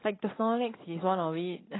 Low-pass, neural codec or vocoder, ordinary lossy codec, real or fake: 7.2 kHz; none; AAC, 16 kbps; real